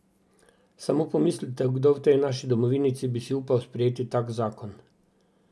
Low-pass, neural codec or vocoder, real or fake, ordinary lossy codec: none; none; real; none